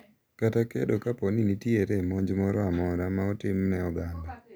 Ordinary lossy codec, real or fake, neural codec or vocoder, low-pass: none; real; none; none